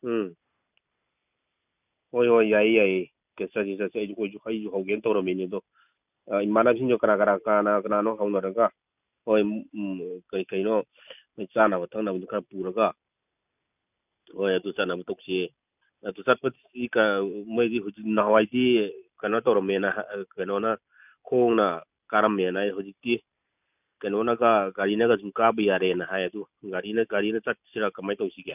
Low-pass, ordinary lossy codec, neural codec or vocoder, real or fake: 3.6 kHz; none; none; real